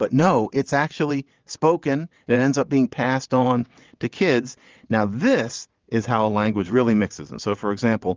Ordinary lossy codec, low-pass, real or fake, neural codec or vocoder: Opus, 24 kbps; 7.2 kHz; fake; vocoder, 22.05 kHz, 80 mel bands, WaveNeXt